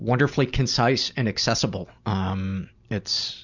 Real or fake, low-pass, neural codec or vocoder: real; 7.2 kHz; none